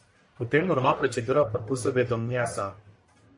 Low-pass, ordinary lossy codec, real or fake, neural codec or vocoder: 10.8 kHz; MP3, 48 kbps; fake; codec, 44.1 kHz, 1.7 kbps, Pupu-Codec